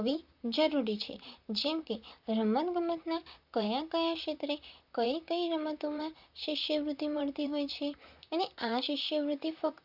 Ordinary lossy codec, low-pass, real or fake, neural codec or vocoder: Opus, 64 kbps; 5.4 kHz; fake; vocoder, 44.1 kHz, 128 mel bands, Pupu-Vocoder